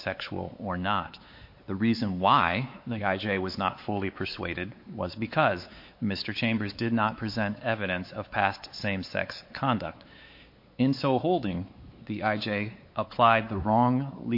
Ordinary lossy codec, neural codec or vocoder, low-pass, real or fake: MP3, 32 kbps; codec, 16 kHz, 4 kbps, X-Codec, WavLM features, trained on Multilingual LibriSpeech; 5.4 kHz; fake